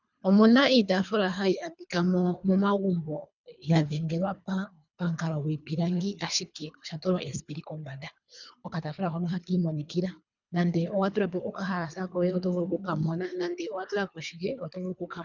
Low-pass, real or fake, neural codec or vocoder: 7.2 kHz; fake; codec, 24 kHz, 3 kbps, HILCodec